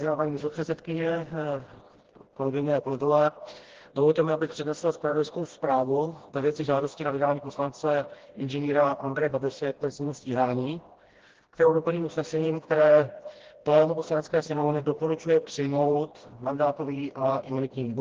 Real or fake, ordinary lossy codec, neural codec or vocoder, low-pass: fake; Opus, 16 kbps; codec, 16 kHz, 1 kbps, FreqCodec, smaller model; 7.2 kHz